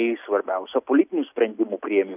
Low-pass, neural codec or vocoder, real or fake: 3.6 kHz; none; real